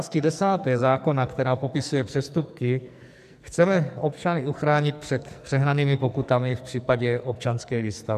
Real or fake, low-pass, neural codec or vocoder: fake; 14.4 kHz; codec, 44.1 kHz, 2.6 kbps, SNAC